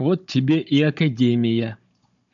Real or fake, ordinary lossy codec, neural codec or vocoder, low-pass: fake; MP3, 96 kbps; codec, 16 kHz, 16 kbps, FunCodec, trained on Chinese and English, 50 frames a second; 7.2 kHz